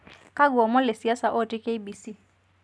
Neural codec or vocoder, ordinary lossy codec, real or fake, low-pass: none; none; real; none